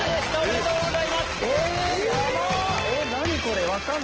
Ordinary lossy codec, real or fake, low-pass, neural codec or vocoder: Opus, 16 kbps; real; 7.2 kHz; none